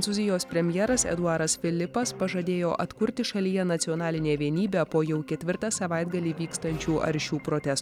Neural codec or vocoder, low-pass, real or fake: none; 19.8 kHz; real